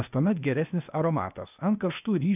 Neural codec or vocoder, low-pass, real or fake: codec, 16 kHz, 0.8 kbps, ZipCodec; 3.6 kHz; fake